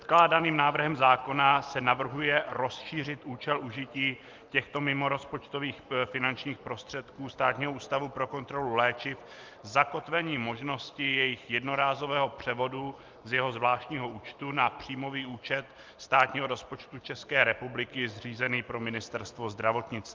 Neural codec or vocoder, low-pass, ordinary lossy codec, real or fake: none; 7.2 kHz; Opus, 32 kbps; real